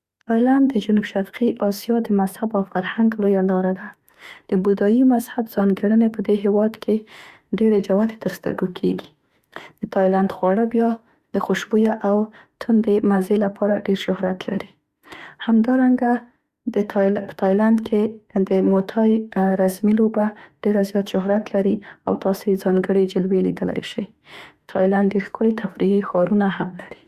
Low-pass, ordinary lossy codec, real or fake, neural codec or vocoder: 14.4 kHz; Opus, 64 kbps; fake; autoencoder, 48 kHz, 32 numbers a frame, DAC-VAE, trained on Japanese speech